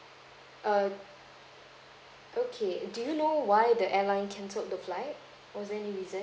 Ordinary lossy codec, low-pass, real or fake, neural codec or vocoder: none; none; real; none